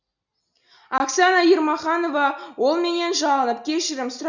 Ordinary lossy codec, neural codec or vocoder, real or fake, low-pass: none; none; real; 7.2 kHz